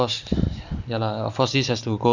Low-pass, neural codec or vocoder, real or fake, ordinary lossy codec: 7.2 kHz; none; real; none